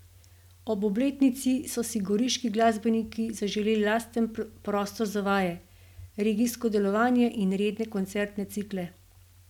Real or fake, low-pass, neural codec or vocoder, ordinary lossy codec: real; 19.8 kHz; none; none